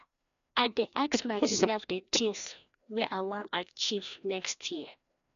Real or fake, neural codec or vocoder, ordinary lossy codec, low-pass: fake; codec, 16 kHz, 1 kbps, FreqCodec, larger model; none; 7.2 kHz